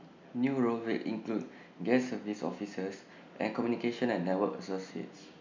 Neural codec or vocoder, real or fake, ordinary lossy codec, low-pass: none; real; MP3, 64 kbps; 7.2 kHz